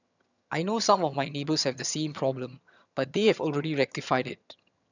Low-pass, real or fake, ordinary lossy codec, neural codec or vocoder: 7.2 kHz; fake; none; vocoder, 22.05 kHz, 80 mel bands, HiFi-GAN